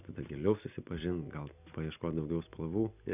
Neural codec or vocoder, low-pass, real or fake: none; 3.6 kHz; real